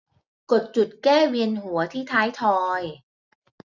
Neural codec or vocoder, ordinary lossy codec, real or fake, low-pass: none; none; real; 7.2 kHz